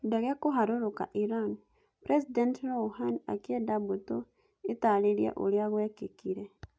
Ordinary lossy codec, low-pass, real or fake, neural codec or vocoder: none; none; real; none